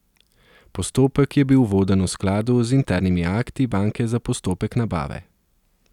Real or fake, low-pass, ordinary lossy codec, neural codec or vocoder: real; 19.8 kHz; none; none